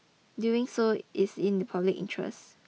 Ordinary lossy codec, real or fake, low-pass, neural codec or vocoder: none; real; none; none